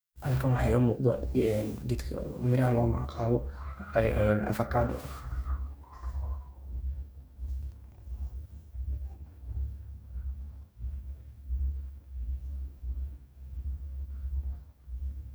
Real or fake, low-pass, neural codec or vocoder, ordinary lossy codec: fake; none; codec, 44.1 kHz, 2.6 kbps, DAC; none